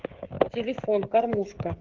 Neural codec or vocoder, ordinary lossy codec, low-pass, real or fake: codec, 16 kHz, 16 kbps, FreqCodec, smaller model; Opus, 16 kbps; 7.2 kHz; fake